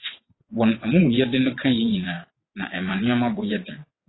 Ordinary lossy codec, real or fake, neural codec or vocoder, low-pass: AAC, 16 kbps; fake; vocoder, 22.05 kHz, 80 mel bands, WaveNeXt; 7.2 kHz